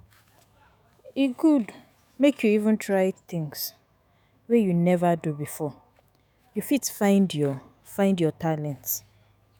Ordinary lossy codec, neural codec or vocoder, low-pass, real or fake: none; autoencoder, 48 kHz, 128 numbers a frame, DAC-VAE, trained on Japanese speech; none; fake